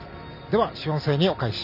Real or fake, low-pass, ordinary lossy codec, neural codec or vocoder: real; 5.4 kHz; none; none